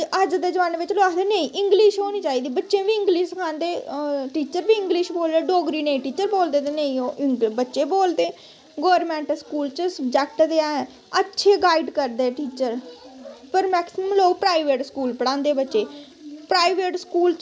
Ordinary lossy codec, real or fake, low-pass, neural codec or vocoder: none; real; none; none